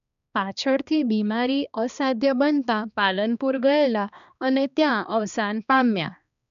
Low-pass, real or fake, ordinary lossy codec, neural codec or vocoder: 7.2 kHz; fake; none; codec, 16 kHz, 2 kbps, X-Codec, HuBERT features, trained on balanced general audio